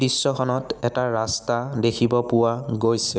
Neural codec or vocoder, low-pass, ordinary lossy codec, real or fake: none; none; none; real